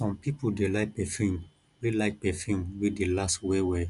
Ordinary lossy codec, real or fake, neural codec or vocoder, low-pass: none; real; none; 10.8 kHz